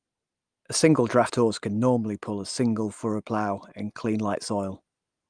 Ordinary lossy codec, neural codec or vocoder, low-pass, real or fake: Opus, 32 kbps; none; 9.9 kHz; real